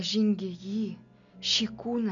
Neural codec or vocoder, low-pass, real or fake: none; 7.2 kHz; real